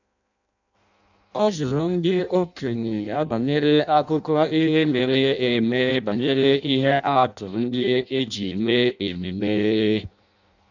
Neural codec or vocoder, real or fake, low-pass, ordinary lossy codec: codec, 16 kHz in and 24 kHz out, 0.6 kbps, FireRedTTS-2 codec; fake; 7.2 kHz; none